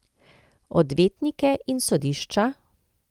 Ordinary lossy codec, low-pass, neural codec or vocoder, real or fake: Opus, 32 kbps; 19.8 kHz; none; real